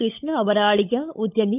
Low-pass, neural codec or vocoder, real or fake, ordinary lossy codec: 3.6 kHz; codec, 16 kHz, 16 kbps, FunCodec, trained on LibriTTS, 50 frames a second; fake; none